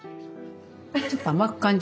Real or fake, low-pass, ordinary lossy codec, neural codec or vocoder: real; none; none; none